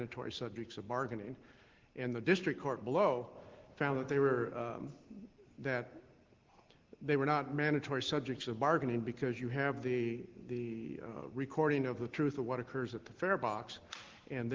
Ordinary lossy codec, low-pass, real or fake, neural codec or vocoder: Opus, 16 kbps; 7.2 kHz; real; none